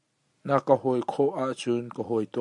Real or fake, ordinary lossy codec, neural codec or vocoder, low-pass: real; MP3, 64 kbps; none; 10.8 kHz